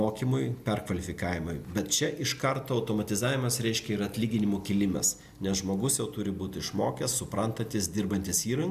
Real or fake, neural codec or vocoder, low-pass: real; none; 14.4 kHz